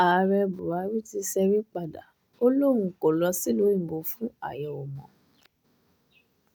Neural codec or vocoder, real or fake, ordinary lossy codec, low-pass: none; real; none; none